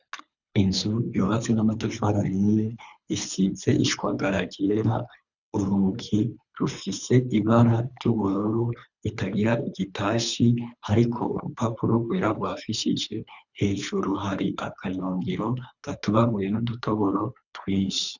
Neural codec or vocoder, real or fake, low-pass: codec, 24 kHz, 3 kbps, HILCodec; fake; 7.2 kHz